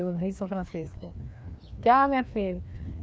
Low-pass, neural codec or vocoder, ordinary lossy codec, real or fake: none; codec, 16 kHz, 2 kbps, FreqCodec, larger model; none; fake